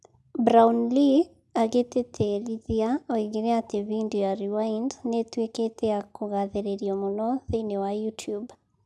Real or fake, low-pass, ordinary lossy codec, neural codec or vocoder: real; none; none; none